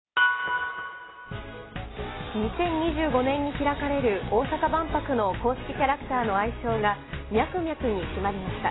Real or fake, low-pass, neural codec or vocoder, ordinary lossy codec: real; 7.2 kHz; none; AAC, 16 kbps